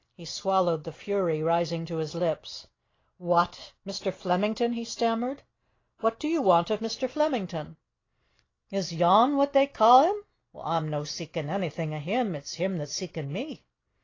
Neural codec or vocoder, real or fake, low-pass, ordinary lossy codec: none; real; 7.2 kHz; AAC, 32 kbps